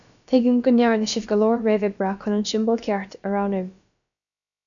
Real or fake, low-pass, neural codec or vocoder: fake; 7.2 kHz; codec, 16 kHz, about 1 kbps, DyCAST, with the encoder's durations